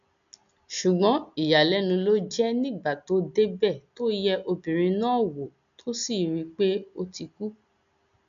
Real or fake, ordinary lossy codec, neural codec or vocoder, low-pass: real; none; none; 7.2 kHz